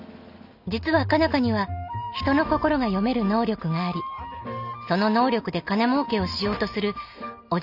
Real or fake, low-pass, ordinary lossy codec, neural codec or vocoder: real; 5.4 kHz; none; none